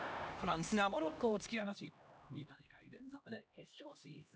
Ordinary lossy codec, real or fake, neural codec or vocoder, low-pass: none; fake; codec, 16 kHz, 1 kbps, X-Codec, HuBERT features, trained on LibriSpeech; none